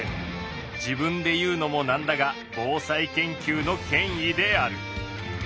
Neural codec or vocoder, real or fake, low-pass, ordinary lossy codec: none; real; none; none